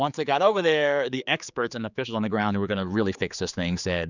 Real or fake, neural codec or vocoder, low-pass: fake; codec, 16 kHz, 4 kbps, X-Codec, HuBERT features, trained on general audio; 7.2 kHz